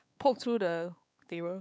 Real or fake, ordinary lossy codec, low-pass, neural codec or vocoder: fake; none; none; codec, 16 kHz, 4 kbps, X-Codec, WavLM features, trained on Multilingual LibriSpeech